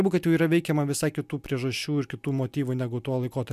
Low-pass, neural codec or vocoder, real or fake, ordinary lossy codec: 14.4 kHz; none; real; MP3, 96 kbps